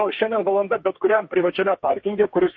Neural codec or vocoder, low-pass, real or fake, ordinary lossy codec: codec, 32 kHz, 1.9 kbps, SNAC; 7.2 kHz; fake; MP3, 32 kbps